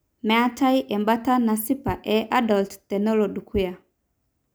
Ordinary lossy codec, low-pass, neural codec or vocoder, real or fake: none; none; none; real